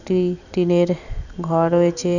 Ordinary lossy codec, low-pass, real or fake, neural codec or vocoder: none; 7.2 kHz; fake; vocoder, 44.1 kHz, 128 mel bands every 256 samples, BigVGAN v2